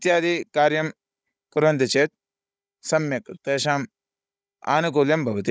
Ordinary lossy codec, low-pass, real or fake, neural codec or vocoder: none; none; fake; codec, 16 kHz, 16 kbps, FunCodec, trained on Chinese and English, 50 frames a second